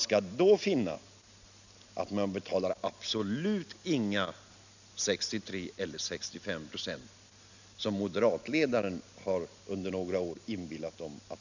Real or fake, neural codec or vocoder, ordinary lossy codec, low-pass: real; none; none; 7.2 kHz